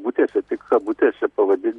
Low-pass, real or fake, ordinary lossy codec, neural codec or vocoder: 10.8 kHz; real; Opus, 64 kbps; none